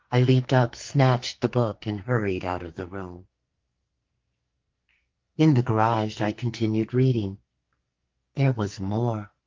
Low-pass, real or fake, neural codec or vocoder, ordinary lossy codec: 7.2 kHz; fake; codec, 44.1 kHz, 2.6 kbps, SNAC; Opus, 32 kbps